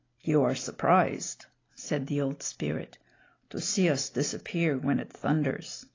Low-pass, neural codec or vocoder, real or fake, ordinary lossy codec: 7.2 kHz; none; real; AAC, 32 kbps